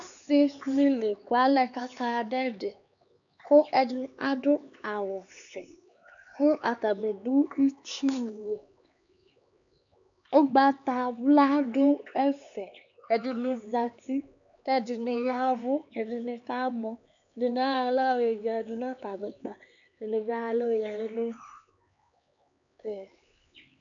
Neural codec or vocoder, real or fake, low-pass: codec, 16 kHz, 4 kbps, X-Codec, HuBERT features, trained on LibriSpeech; fake; 7.2 kHz